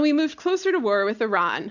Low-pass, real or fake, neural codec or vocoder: 7.2 kHz; real; none